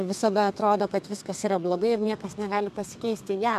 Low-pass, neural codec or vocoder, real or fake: 14.4 kHz; codec, 32 kHz, 1.9 kbps, SNAC; fake